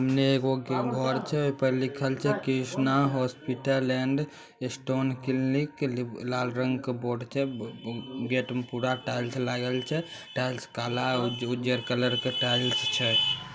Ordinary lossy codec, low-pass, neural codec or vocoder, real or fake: none; none; none; real